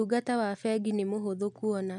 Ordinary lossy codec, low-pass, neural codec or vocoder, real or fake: none; 10.8 kHz; none; real